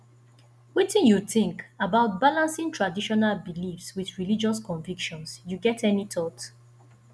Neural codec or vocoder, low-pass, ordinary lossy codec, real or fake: none; none; none; real